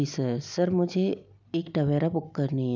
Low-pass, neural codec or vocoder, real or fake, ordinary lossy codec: 7.2 kHz; none; real; none